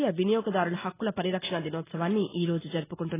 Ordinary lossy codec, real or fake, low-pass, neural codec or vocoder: AAC, 16 kbps; real; 3.6 kHz; none